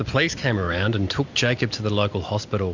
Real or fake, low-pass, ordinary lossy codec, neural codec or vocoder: real; 7.2 kHz; MP3, 64 kbps; none